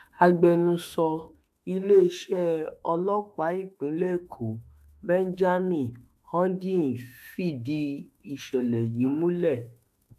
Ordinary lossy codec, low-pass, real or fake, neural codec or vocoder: AAC, 96 kbps; 14.4 kHz; fake; autoencoder, 48 kHz, 32 numbers a frame, DAC-VAE, trained on Japanese speech